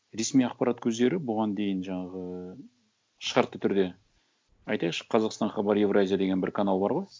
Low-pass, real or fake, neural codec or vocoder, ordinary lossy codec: none; real; none; none